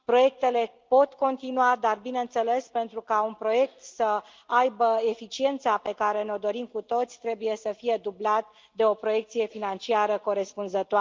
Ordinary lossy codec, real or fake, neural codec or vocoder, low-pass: Opus, 32 kbps; real; none; 7.2 kHz